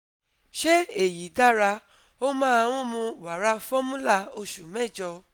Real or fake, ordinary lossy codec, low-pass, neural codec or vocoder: real; none; none; none